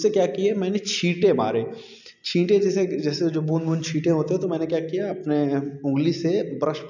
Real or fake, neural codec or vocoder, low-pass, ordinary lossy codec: real; none; 7.2 kHz; none